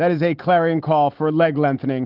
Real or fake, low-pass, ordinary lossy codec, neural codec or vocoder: real; 5.4 kHz; Opus, 16 kbps; none